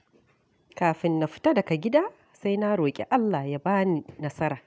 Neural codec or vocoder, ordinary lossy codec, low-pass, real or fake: none; none; none; real